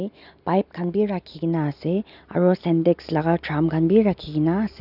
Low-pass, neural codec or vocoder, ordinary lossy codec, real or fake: 5.4 kHz; none; none; real